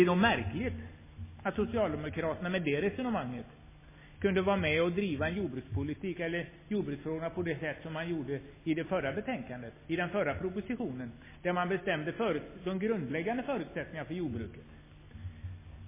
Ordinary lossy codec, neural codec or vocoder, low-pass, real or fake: MP3, 16 kbps; none; 3.6 kHz; real